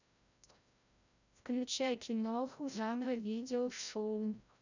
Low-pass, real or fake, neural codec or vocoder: 7.2 kHz; fake; codec, 16 kHz, 0.5 kbps, FreqCodec, larger model